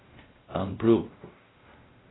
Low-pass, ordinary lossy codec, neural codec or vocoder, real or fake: 7.2 kHz; AAC, 16 kbps; codec, 16 kHz, 0.5 kbps, X-Codec, WavLM features, trained on Multilingual LibriSpeech; fake